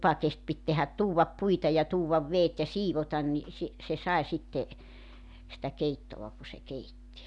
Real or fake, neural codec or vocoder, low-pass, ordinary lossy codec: real; none; 10.8 kHz; none